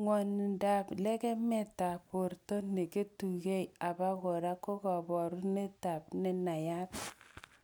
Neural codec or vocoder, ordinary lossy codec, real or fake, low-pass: none; none; real; none